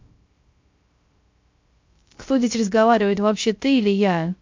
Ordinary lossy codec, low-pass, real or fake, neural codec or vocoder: MP3, 48 kbps; 7.2 kHz; fake; codec, 16 kHz, 0.3 kbps, FocalCodec